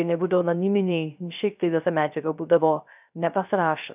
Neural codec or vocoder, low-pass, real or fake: codec, 16 kHz, 0.3 kbps, FocalCodec; 3.6 kHz; fake